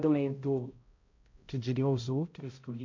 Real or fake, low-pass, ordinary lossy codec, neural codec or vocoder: fake; 7.2 kHz; MP3, 48 kbps; codec, 16 kHz, 0.5 kbps, X-Codec, HuBERT features, trained on balanced general audio